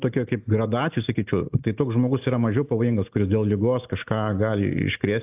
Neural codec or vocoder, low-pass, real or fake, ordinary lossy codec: none; 3.6 kHz; real; AAC, 32 kbps